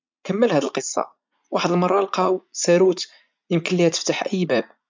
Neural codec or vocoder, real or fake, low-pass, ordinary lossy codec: vocoder, 44.1 kHz, 80 mel bands, Vocos; fake; 7.2 kHz; MP3, 64 kbps